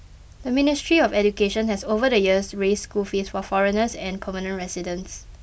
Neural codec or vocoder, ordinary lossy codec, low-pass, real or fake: none; none; none; real